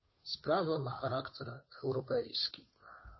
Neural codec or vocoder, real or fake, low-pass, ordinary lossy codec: codec, 16 kHz, 2 kbps, FunCodec, trained on Chinese and English, 25 frames a second; fake; 7.2 kHz; MP3, 24 kbps